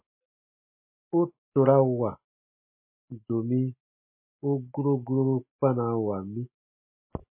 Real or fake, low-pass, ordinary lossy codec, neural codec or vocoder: real; 3.6 kHz; MP3, 24 kbps; none